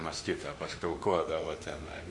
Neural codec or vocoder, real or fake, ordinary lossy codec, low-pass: autoencoder, 48 kHz, 32 numbers a frame, DAC-VAE, trained on Japanese speech; fake; Opus, 64 kbps; 10.8 kHz